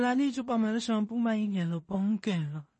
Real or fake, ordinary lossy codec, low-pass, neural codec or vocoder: fake; MP3, 32 kbps; 10.8 kHz; codec, 16 kHz in and 24 kHz out, 0.4 kbps, LongCat-Audio-Codec, two codebook decoder